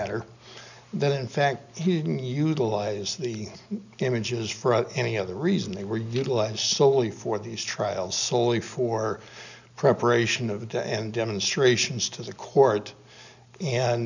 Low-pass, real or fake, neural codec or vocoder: 7.2 kHz; real; none